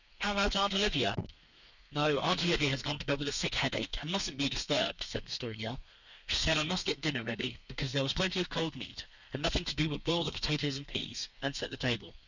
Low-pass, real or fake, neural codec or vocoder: 7.2 kHz; fake; codec, 32 kHz, 1.9 kbps, SNAC